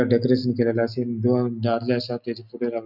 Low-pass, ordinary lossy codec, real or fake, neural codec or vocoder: 5.4 kHz; none; real; none